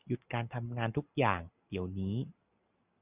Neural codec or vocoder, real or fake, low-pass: none; real; 3.6 kHz